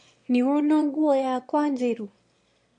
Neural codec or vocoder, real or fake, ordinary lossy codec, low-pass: autoencoder, 22.05 kHz, a latent of 192 numbers a frame, VITS, trained on one speaker; fake; MP3, 48 kbps; 9.9 kHz